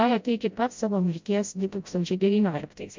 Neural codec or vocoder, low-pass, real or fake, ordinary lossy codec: codec, 16 kHz, 0.5 kbps, FreqCodec, smaller model; 7.2 kHz; fake; MP3, 64 kbps